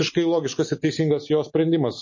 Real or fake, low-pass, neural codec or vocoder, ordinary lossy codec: real; 7.2 kHz; none; MP3, 32 kbps